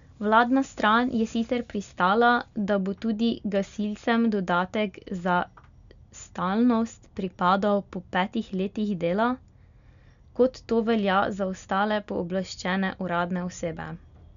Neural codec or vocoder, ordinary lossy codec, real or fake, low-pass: none; none; real; 7.2 kHz